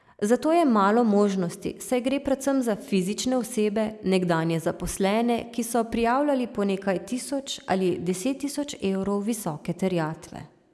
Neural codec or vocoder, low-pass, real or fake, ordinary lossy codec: none; none; real; none